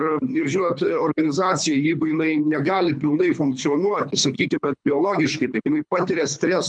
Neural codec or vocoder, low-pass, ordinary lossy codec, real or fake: codec, 24 kHz, 3 kbps, HILCodec; 9.9 kHz; MP3, 96 kbps; fake